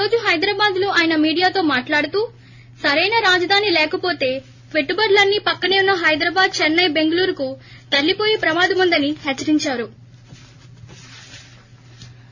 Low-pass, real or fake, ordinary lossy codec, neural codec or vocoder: 7.2 kHz; real; none; none